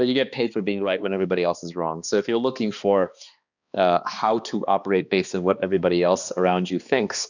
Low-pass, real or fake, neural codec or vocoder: 7.2 kHz; fake; codec, 16 kHz, 2 kbps, X-Codec, HuBERT features, trained on balanced general audio